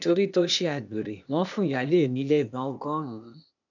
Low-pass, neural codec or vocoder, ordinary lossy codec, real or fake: 7.2 kHz; codec, 16 kHz, 0.8 kbps, ZipCodec; none; fake